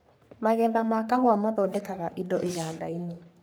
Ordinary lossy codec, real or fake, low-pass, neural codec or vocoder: none; fake; none; codec, 44.1 kHz, 3.4 kbps, Pupu-Codec